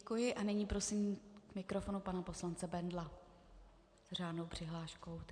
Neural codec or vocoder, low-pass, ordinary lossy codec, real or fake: none; 9.9 kHz; MP3, 64 kbps; real